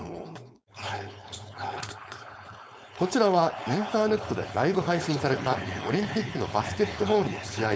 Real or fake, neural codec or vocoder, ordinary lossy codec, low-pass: fake; codec, 16 kHz, 4.8 kbps, FACodec; none; none